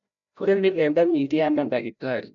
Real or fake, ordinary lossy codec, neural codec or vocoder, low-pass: fake; none; codec, 16 kHz, 0.5 kbps, FreqCodec, larger model; 7.2 kHz